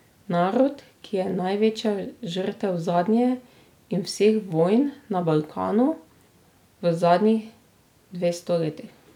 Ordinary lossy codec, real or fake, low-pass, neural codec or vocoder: none; real; 19.8 kHz; none